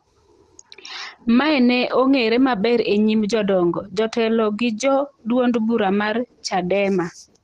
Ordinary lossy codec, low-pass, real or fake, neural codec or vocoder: Opus, 16 kbps; 14.4 kHz; real; none